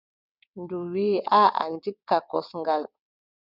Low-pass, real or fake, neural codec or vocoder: 5.4 kHz; fake; vocoder, 22.05 kHz, 80 mel bands, WaveNeXt